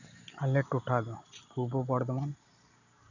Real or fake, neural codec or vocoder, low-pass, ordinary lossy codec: real; none; 7.2 kHz; none